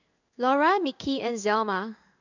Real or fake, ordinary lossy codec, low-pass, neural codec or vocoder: fake; none; 7.2 kHz; codec, 16 kHz in and 24 kHz out, 1 kbps, XY-Tokenizer